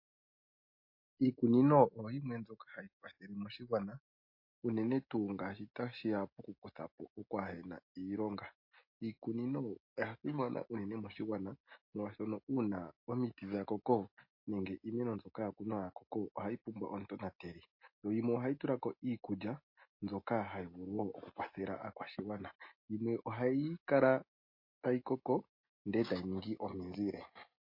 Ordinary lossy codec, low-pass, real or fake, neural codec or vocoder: MP3, 32 kbps; 5.4 kHz; real; none